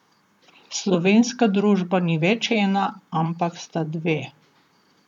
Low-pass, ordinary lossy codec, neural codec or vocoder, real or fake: 19.8 kHz; none; vocoder, 44.1 kHz, 128 mel bands, Pupu-Vocoder; fake